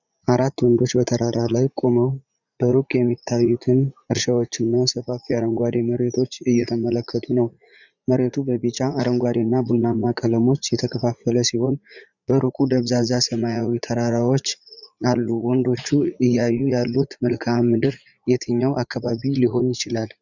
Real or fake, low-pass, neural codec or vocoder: fake; 7.2 kHz; vocoder, 44.1 kHz, 80 mel bands, Vocos